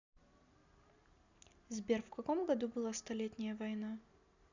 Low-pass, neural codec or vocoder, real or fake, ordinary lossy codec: 7.2 kHz; none; real; none